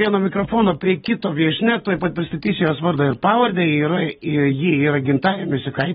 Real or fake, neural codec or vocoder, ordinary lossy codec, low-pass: real; none; AAC, 16 kbps; 10.8 kHz